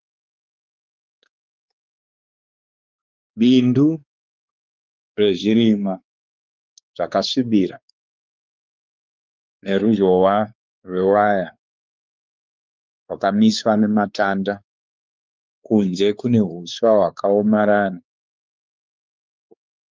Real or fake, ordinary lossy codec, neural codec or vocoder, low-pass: fake; Opus, 32 kbps; codec, 16 kHz, 4 kbps, X-Codec, WavLM features, trained on Multilingual LibriSpeech; 7.2 kHz